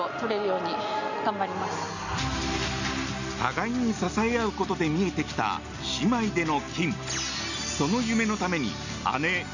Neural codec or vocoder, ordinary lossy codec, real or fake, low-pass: none; none; real; 7.2 kHz